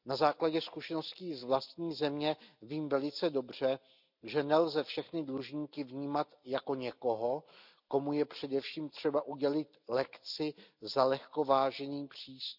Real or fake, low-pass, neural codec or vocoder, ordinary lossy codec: real; 5.4 kHz; none; none